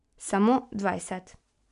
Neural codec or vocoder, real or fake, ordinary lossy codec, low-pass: none; real; none; 10.8 kHz